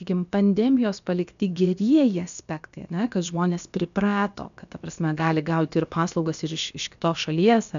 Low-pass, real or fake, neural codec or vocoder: 7.2 kHz; fake; codec, 16 kHz, about 1 kbps, DyCAST, with the encoder's durations